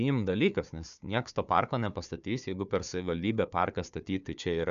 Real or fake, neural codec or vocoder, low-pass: fake; codec, 16 kHz, 4 kbps, FunCodec, trained on Chinese and English, 50 frames a second; 7.2 kHz